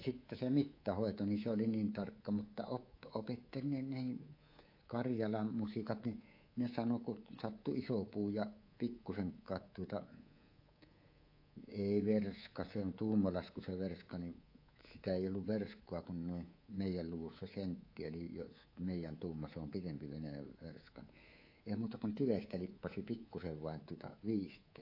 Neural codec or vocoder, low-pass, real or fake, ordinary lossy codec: codec, 24 kHz, 3.1 kbps, DualCodec; 5.4 kHz; fake; AAC, 32 kbps